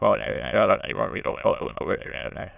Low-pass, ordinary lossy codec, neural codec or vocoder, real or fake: 3.6 kHz; none; autoencoder, 22.05 kHz, a latent of 192 numbers a frame, VITS, trained on many speakers; fake